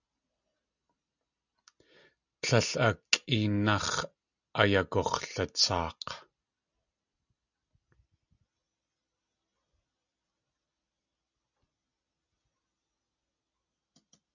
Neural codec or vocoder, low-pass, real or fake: none; 7.2 kHz; real